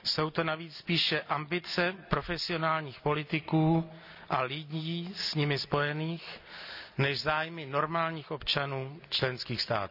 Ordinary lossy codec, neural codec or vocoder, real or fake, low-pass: none; none; real; 5.4 kHz